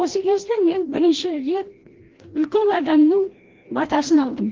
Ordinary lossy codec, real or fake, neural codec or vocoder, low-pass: Opus, 16 kbps; fake; codec, 16 kHz, 1 kbps, FreqCodec, larger model; 7.2 kHz